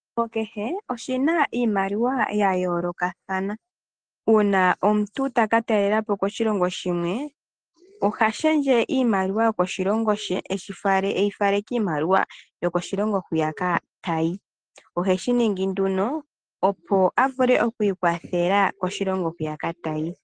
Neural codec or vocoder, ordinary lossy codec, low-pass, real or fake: none; Opus, 16 kbps; 9.9 kHz; real